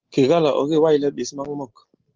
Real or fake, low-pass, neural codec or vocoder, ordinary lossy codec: real; 7.2 kHz; none; Opus, 16 kbps